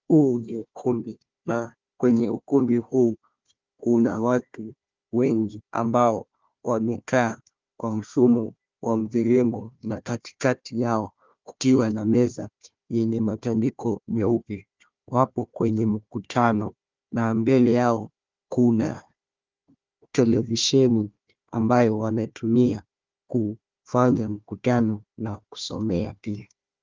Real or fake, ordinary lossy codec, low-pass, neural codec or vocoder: fake; Opus, 32 kbps; 7.2 kHz; codec, 16 kHz, 1 kbps, FunCodec, trained on Chinese and English, 50 frames a second